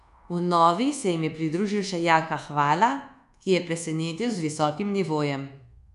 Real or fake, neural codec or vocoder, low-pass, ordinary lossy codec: fake; codec, 24 kHz, 1.2 kbps, DualCodec; 10.8 kHz; none